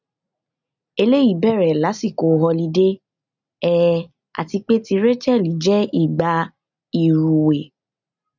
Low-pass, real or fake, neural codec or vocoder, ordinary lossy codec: 7.2 kHz; real; none; none